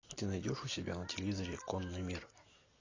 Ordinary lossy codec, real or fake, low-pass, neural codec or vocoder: AAC, 32 kbps; real; 7.2 kHz; none